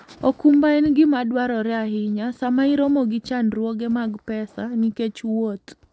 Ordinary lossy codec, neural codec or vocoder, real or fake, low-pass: none; none; real; none